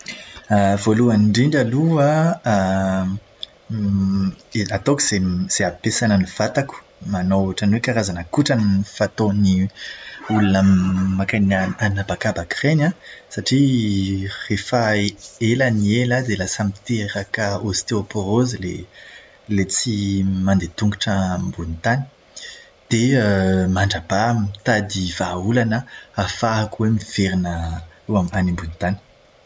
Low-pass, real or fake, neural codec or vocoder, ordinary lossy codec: none; real; none; none